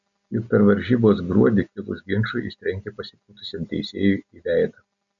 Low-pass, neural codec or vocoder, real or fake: 7.2 kHz; none; real